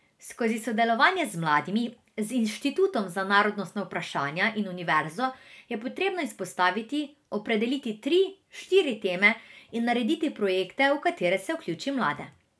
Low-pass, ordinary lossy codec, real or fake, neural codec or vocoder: none; none; real; none